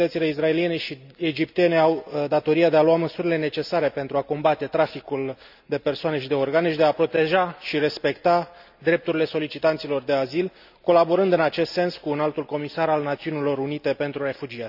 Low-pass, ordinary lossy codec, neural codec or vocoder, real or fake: 5.4 kHz; none; none; real